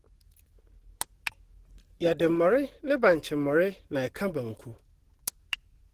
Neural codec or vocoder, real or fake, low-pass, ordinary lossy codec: codec, 44.1 kHz, 7.8 kbps, DAC; fake; 14.4 kHz; Opus, 16 kbps